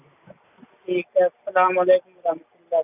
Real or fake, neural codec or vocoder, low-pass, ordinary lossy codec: real; none; 3.6 kHz; none